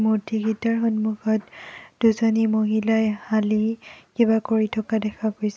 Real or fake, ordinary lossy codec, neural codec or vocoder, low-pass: real; none; none; none